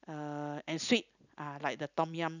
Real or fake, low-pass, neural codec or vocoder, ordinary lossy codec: real; 7.2 kHz; none; none